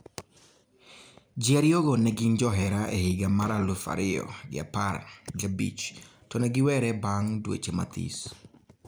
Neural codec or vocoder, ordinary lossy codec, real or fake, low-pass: none; none; real; none